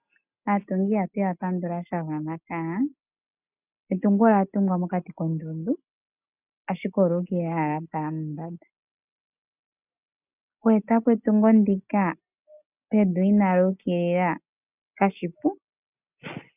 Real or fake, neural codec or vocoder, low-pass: real; none; 3.6 kHz